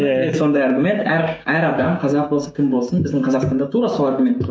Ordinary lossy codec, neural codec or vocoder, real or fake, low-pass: none; codec, 16 kHz, 6 kbps, DAC; fake; none